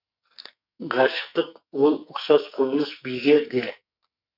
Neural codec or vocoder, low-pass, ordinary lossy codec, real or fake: codec, 44.1 kHz, 2.6 kbps, SNAC; 5.4 kHz; none; fake